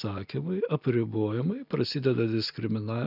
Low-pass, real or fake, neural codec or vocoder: 5.4 kHz; real; none